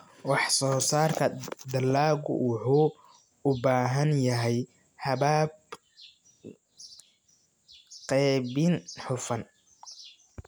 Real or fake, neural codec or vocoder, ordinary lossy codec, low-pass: real; none; none; none